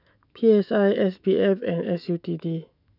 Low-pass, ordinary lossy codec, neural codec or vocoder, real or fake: 5.4 kHz; none; autoencoder, 48 kHz, 128 numbers a frame, DAC-VAE, trained on Japanese speech; fake